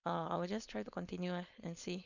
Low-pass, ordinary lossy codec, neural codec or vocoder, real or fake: 7.2 kHz; none; codec, 16 kHz, 4.8 kbps, FACodec; fake